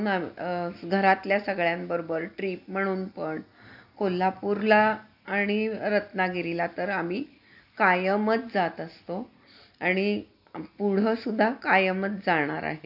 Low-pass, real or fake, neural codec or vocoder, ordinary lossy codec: 5.4 kHz; real; none; AAC, 48 kbps